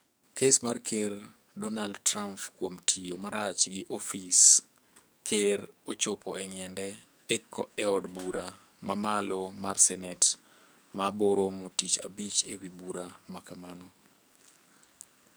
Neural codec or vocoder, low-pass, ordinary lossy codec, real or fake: codec, 44.1 kHz, 2.6 kbps, SNAC; none; none; fake